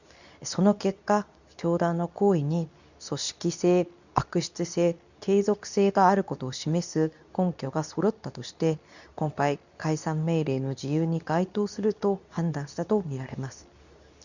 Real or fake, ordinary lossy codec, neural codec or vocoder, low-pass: fake; none; codec, 24 kHz, 0.9 kbps, WavTokenizer, medium speech release version 2; 7.2 kHz